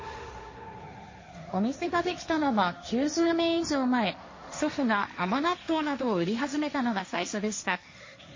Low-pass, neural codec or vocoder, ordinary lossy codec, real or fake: 7.2 kHz; codec, 16 kHz, 1.1 kbps, Voila-Tokenizer; MP3, 32 kbps; fake